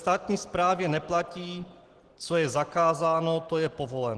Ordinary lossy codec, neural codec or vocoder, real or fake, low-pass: Opus, 16 kbps; none; real; 10.8 kHz